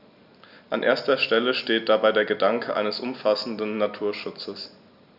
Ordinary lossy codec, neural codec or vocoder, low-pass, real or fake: none; none; 5.4 kHz; real